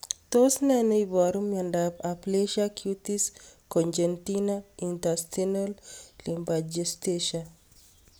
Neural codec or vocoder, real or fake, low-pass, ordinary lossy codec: none; real; none; none